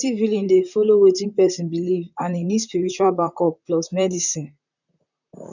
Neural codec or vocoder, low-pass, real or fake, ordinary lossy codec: vocoder, 44.1 kHz, 128 mel bands, Pupu-Vocoder; 7.2 kHz; fake; none